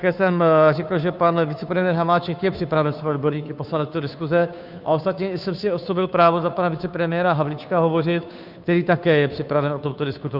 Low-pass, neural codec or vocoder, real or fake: 5.4 kHz; codec, 16 kHz, 2 kbps, FunCodec, trained on Chinese and English, 25 frames a second; fake